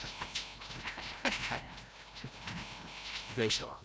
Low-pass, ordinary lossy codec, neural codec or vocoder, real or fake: none; none; codec, 16 kHz, 0.5 kbps, FreqCodec, larger model; fake